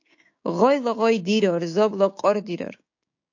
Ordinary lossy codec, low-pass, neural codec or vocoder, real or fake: AAC, 48 kbps; 7.2 kHz; codec, 16 kHz, 6 kbps, DAC; fake